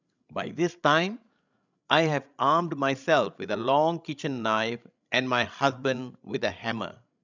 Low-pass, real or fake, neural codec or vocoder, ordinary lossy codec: 7.2 kHz; fake; codec, 16 kHz, 16 kbps, FreqCodec, larger model; none